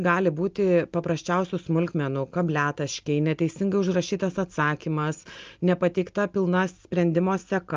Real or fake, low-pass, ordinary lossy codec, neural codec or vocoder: real; 7.2 kHz; Opus, 24 kbps; none